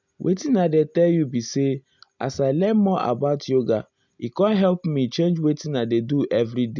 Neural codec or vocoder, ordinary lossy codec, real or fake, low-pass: none; none; real; 7.2 kHz